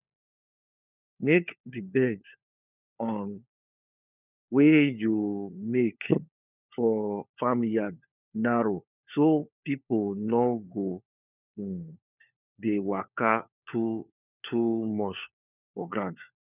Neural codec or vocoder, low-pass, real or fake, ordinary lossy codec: codec, 16 kHz, 4 kbps, FunCodec, trained on LibriTTS, 50 frames a second; 3.6 kHz; fake; none